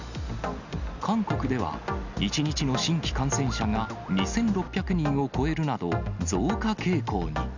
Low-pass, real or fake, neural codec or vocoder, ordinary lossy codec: 7.2 kHz; real; none; none